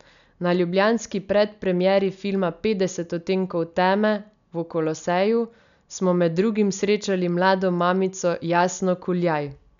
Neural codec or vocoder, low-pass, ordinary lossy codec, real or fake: none; 7.2 kHz; none; real